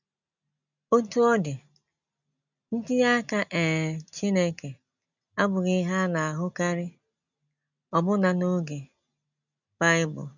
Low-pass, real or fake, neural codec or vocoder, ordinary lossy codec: 7.2 kHz; real; none; none